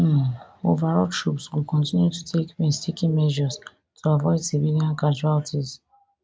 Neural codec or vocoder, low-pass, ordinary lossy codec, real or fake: none; none; none; real